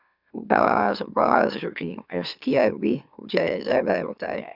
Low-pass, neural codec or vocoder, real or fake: 5.4 kHz; autoencoder, 44.1 kHz, a latent of 192 numbers a frame, MeloTTS; fake